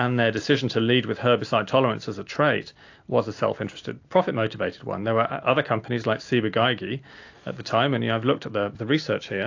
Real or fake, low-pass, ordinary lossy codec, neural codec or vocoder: real; 7.2 kHz; AAC, 48 kbps; none